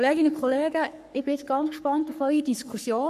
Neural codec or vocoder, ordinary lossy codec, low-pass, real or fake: codec, 44.1 kHz, 3.4 kbps, Pupu-Codec; none; 14.4 kHz; fake